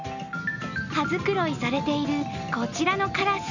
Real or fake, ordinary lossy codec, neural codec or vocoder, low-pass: real; none; none; 7.2 kHz